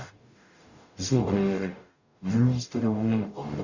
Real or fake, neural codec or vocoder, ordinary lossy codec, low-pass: fake; codec, 44.1 kHz, 0.9 kbps, DAC; none; 7.2 kHz